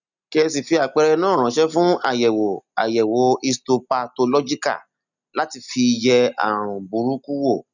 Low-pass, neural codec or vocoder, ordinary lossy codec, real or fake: 7.2 kHz; none; none; real